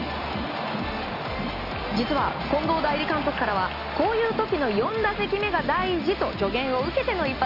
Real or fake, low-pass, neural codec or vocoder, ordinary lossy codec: real; 5.4 kHz; none; Opus, 64 kbps